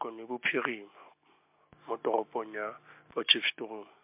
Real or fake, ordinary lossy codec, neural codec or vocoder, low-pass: real; MP3, 32 kbps; none; 3.6 kHz